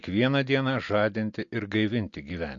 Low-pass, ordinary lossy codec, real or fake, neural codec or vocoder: 7.2 kHz; MP3, 48 kbps; real; none